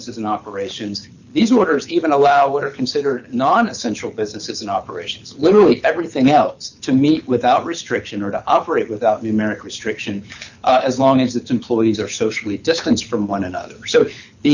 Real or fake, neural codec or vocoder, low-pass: fake; codec, 24 kHz, 6 kbps, HILCodec; 7.2 kHz